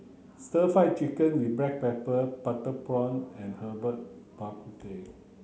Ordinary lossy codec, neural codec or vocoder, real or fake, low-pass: none; none; real; none